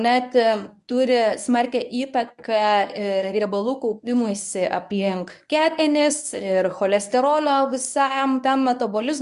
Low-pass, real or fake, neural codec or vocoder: 10.8 kHz; fake; codec, 24 kHz, 0.9 kbps, WavTokenizer, medium speech release version 1